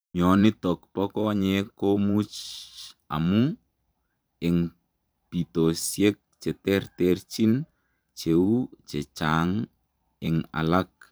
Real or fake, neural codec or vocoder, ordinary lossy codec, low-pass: fake; vocoder, 44.1 kHz, 128 mel bands every 512 samples, BigVGAN v2; none; none